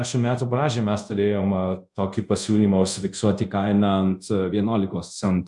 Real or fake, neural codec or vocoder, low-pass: fake; codec, 24 kHz, 0.5 kbps, DualCodec; 10.8 kHz